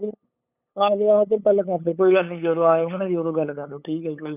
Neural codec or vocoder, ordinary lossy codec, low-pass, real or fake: codec, 16 kHz, 8 kbps, FunCodec, trained on LibriTTS, 25 frames a second; none; 3.6 kHz; fake